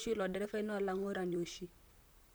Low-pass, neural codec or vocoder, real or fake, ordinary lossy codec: none; vocoder, 44.1 kHz, 128 mel bands, Pupu-Vocoder; fake; none